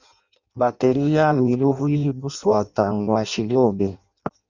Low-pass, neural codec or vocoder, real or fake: 7.2 kHz; codec, 16 kHz in and 24 kHz out, 0.6 kbps, FireRedTTS-2 codec; fake